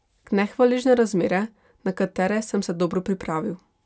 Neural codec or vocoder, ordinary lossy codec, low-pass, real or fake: none; none; none; real